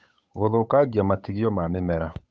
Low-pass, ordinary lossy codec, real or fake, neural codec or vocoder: none; none; fake; codec, 16 kHz, 8 kbps, FunCodec, trained on Chinese and English, 25 frames a second